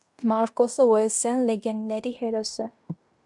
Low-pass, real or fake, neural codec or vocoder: 10.8 kHz; fake; codec, 16 kHz in and 24 kHz out, 0.9 kbps, LongCat-Audio-Codec, fine tuned four codebook decoder